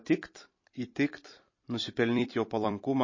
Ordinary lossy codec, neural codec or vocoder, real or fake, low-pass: MP3, 32 kbps; vocoder, 44.1 kHz, 128 mel bands every 256 samples, BigVGAN v2; fake; 7.2 kHz